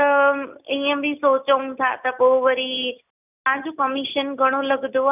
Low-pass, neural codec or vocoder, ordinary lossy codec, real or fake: 3.6 kHz; none; none; real